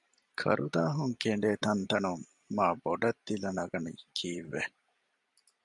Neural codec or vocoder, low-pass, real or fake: vocoder, 44.1 kHz, 128 mel bands every 256 samples, BigVGAN v2; 10.8 kHz; fake